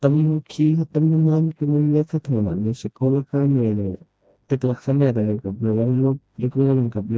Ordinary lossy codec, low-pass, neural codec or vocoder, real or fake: none; none; codec, 16 kHz, 1 kbps, FreqCodec, smaller model; fake